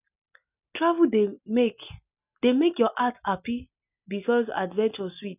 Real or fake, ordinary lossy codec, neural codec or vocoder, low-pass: real; none; none; 3.6 kHz